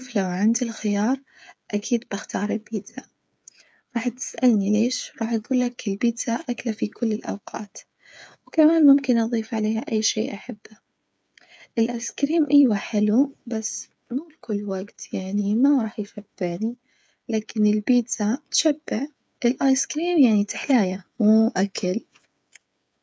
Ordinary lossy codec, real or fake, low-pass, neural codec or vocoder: none; fake; none; codec, 16 kHz, 8 kbps, FreqCodec, smaller model